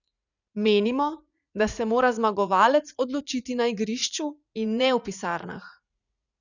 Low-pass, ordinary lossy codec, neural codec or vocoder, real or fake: 7.2 kHz; none; vocoder, 44.1 kHz, 80 mel bands, Vocos; fake